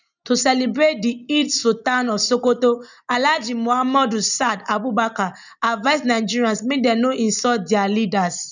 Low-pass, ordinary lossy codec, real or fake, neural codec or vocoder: 7.2 kHz; none; real; none